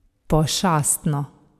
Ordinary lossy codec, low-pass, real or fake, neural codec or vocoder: AAC, 96 kbps; 14.4 kHz; real; none